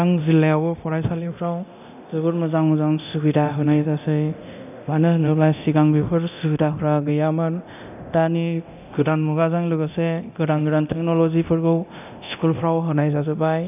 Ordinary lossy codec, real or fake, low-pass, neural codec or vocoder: none; fake; 3.6 kHz; codec, 24 kHz, 0.9 kbps, DualCodec